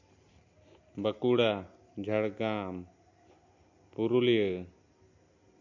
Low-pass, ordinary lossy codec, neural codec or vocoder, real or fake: 7.2 kHz; MP3, 48 kbps; none; real